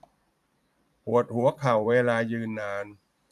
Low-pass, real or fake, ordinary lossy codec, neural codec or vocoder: 14.4 kHz; fake; none; vocoder, 44.1 kHz, 128 mel bands every 512 samples, BigVGAN v2